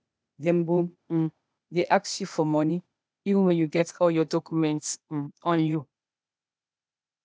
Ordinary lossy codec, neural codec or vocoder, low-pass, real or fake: none; codec, 16 kHz, 0.8 kbps, ZipCodec; none; fake